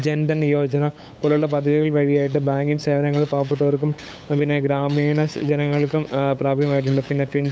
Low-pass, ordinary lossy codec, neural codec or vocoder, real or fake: none; none; codec, 16 kHz, 8 kbps, FunCodec, trained on LibriTTS, 25 frames a second; fake